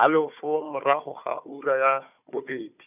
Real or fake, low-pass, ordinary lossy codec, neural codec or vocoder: fake; 3.6 kHz; none; codec, 16 kHz, 4 kbps, FunCodec, trained on Chinese and English, 50 frames a second